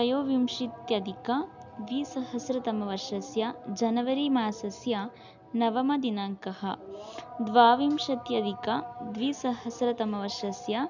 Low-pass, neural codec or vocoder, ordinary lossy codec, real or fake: 7.2 kHz; none; none; real